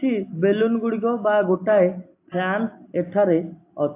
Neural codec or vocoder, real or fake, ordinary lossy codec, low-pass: none; real; AAC, 24 kbps; 3.6 kHz